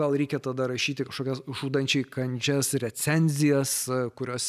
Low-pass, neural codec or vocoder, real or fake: 14.4 kHz; none; real